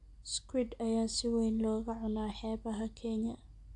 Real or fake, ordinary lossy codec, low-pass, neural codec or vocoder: real; AAC, 64 kbps; 10.8 kHz; none